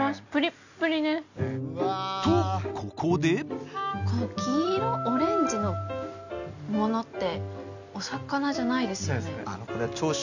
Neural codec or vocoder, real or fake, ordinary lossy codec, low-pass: none; real; none; 7.2 kHz